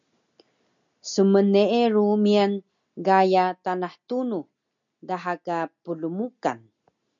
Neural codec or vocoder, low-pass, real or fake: none; 7.2 kHz; real